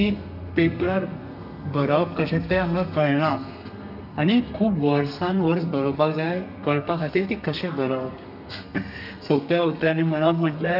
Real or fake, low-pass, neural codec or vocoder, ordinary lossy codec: fake; 5.4 kHz; codec, 32 kHz, 1.9 kbps, SNAC; none